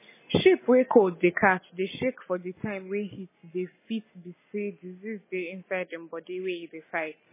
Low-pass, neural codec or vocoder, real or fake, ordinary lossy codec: 3.6 kHz; none; real; MP3, 16 kbps